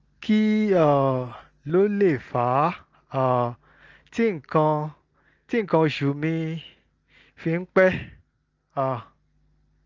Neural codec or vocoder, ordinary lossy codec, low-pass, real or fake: none; Opus, 24 kbps; 7.2 kHz; real